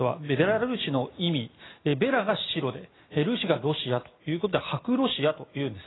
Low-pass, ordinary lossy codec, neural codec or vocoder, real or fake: 7.2 kHz; AAC, 16 kbps; none; real